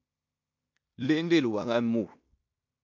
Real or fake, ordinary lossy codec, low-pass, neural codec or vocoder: fake; MP3, 48 kbps; 7.2 kHz; codec, 16 kHz in and 24 kHz out, 0.9 kbps, LongCat-Audio-Codec, fine tuned four codebook decoder